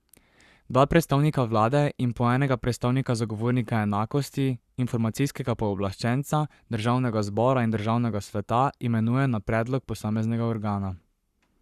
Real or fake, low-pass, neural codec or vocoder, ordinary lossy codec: fake; 14.4 kHz; codec, 44.1 kHz, 7.8 kbps, Pupu-Codec; Opus, 64 kbps